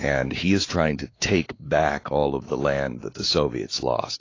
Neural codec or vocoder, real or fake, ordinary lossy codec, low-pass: codec, 16 kHz, 4 kbps, X-Codec, WavLM features, trained on Multilingual LibriSpeech; fake; AAC, 32 kbps; 7.2 kHz